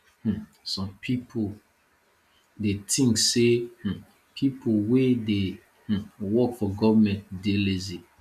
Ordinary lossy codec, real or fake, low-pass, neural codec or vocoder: none; real; 14.4 kHz; none